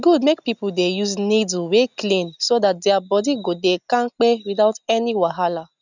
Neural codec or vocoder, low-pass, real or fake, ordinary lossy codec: none; 7.2 kHz; real; none